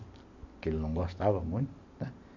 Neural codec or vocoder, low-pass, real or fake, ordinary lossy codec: none; 7.2 kHz; real; AAC, 48 kbps